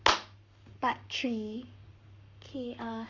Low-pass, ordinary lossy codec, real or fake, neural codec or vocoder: 7.2 kHz; none; fake; codec, 44.1 kHz, 7.8 kbps, Pupu-Codec